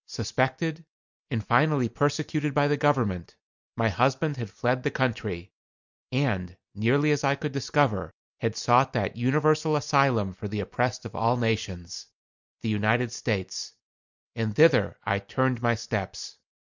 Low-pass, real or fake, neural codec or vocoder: 7.2 kHz; real; none